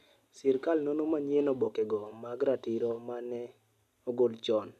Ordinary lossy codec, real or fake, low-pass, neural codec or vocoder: none; real; 14.4 kHz; none